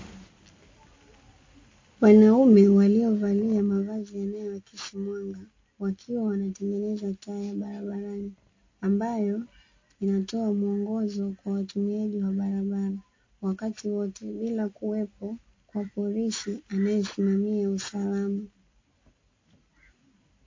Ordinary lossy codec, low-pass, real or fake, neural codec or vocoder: MP3, 32 kbps; 7.2 kHz; real; none